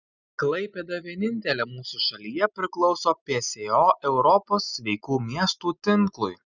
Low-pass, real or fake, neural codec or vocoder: 7.2 kHz; real; none